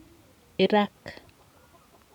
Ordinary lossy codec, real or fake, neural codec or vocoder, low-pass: none; real; none; 19.8 kHz